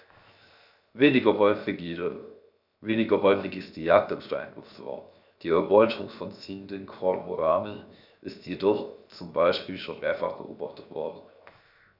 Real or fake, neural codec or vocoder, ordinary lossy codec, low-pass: fake; codec, 16 kHz, 0.7 kbps, FocalCodec; none; 5.4 kHz